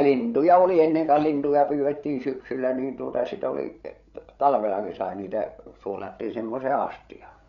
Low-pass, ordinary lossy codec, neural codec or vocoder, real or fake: 7.2 kHz; none; codec, 16 kHz, 8 kbps, FreqCodec, larger model; fake